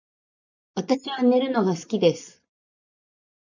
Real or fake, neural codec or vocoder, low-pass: real; none; 7.2 kHz